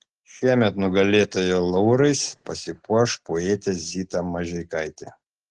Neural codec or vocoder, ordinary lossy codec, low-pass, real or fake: none; Opus, 16 kbps; 10.8 kHz; real